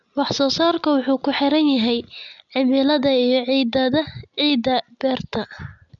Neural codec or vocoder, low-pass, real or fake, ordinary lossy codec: none; 7.2 kHz; real; none